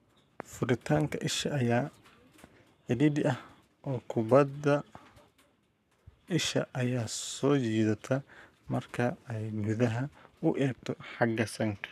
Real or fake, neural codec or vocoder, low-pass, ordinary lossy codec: fake; codec, 44.1 kHz, 7.8 kbps, Pupu-Codec; 14.4 kHz; none